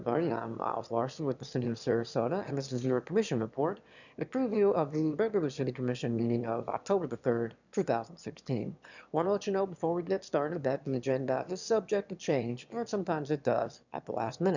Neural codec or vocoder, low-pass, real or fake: autoencoder, 22.05 kHz, a latent of 192 numbers a frame, VITS, trained on one speaker; 7.2 kHz; fake